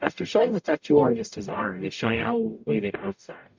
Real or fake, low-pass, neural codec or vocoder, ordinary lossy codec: fake; 7.2 kHz; codec, 44.1 kHz, 0.9 kbps, DAC; MP3, 64 kbps